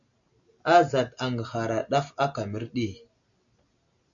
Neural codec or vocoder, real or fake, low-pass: none; real; 7.2 kHz